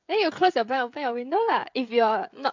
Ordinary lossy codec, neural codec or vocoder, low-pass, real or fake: MP3, 64 kbps; codec, 16 kHz, 16 kbps, FreqCodec, smaller model; 7.2 kHz; fake